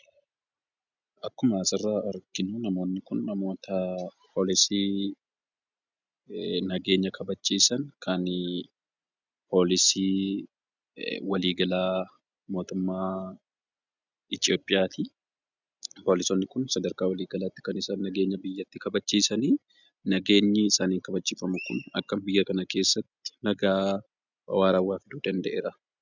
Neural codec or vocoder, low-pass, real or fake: none; 7.2 kHz; real